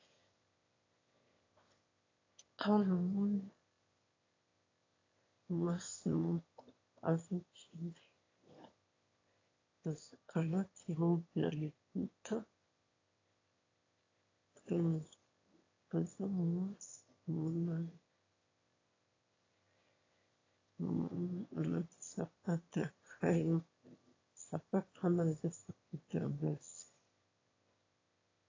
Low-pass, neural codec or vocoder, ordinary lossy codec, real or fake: 7.2 kHz; autoencoder, 22.05 kHz, a latent of 192 numbers a frame, VITS, trained on one speaker; AAC, 32 kbps; fake